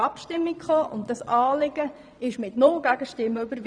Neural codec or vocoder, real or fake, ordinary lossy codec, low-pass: vocoder, 22.05 kHz, 80 mel bands, Vocos; fake; none; 9.9 kHz